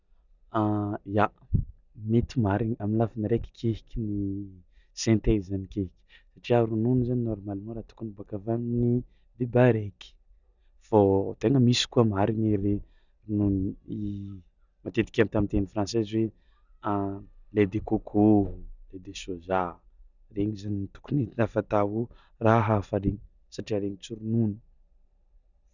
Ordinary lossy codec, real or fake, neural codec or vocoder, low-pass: none; real; none; 7.2 kHz